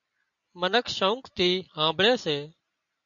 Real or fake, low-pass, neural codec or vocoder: real; 7.2 kHz; none